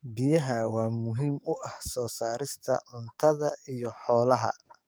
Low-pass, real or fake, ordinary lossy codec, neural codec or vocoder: none; fake; none; codec, 44.1 kHz, 7.8 kbps, DAC